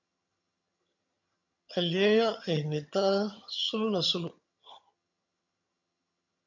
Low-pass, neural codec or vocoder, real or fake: 7.2 kHz; vocoder, 22.05 kHz, 80 mel bands, HiFi-GAN; fake